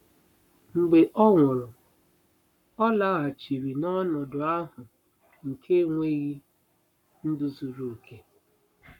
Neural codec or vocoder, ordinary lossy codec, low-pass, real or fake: codec, 44.1 kHz, 7.8 kbps, Pupu-Codec; none; 19.8 kHz; fake